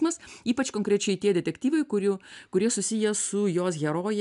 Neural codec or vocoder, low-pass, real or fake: none; 10.8 kHz; real